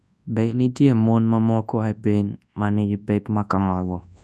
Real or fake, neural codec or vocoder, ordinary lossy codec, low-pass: fake; codec, 24 kHz, 0.9 kbps, WavTokenizer, large speech release; none; none